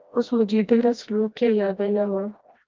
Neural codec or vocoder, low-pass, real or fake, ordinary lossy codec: codec, 16 kHz, 1 kbps, FreqCodec, smaller model; 7.2 kHz; fake; Opus, 32 kbps